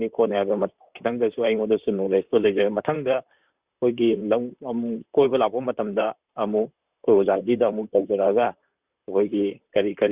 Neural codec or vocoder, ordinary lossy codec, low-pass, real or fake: vocoder, 44.1 kHz, 128 mel bands, Pupu-Vocoder; Opus, 64 kbps; 3.6 kHz; fake